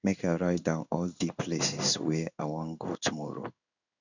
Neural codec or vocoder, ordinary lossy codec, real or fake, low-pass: codec, 16 kHz in and 24 kHz out, 1 kbps, XY-Tokenizer; none; fake; 7.2 kHz